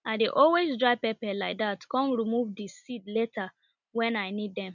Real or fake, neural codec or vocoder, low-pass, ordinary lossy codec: real; none; 7.2 kHz; none